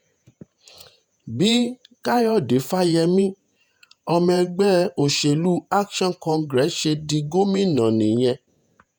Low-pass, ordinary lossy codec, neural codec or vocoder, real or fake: none; none; vocoder, 48 kHz, 128 mel bands, Vocos; fake